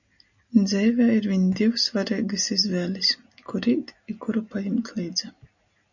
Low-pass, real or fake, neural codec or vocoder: 7.2 kHz; real; none